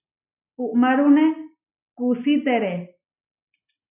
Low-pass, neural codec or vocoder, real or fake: 3.6 kHz; none; real